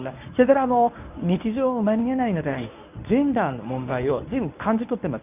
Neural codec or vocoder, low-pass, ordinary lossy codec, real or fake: codec, 24 kHz, 0.9 kbps, WavTokenizer, medium speech release version 1; 3.6 kHz; none; fake